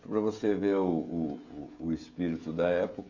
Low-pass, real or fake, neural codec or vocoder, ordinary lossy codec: 7.2 kHz; fake; codec, 16 kHz, 16 kbps, FreqCodec, smaller model; none